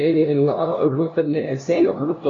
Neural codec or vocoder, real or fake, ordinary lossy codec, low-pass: codec, 16 kHz, 0.5 kbps, FunCodec, trained on LibriTTS, 25 frames a second; fake; AAC, 32 kbps; 7.2 kHz